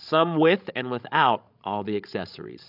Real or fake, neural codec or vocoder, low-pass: fake; codec, 16 kHz, 16 kbps, FreqCodec, larger model; 5.4 kHz